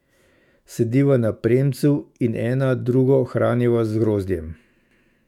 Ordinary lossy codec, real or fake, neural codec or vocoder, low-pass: MP3, 96 kbps; fake; autoencoder, 48 kHz, 128 numbers a frame, DAC-VAE, trained on Japanese speech; 19.8 kHz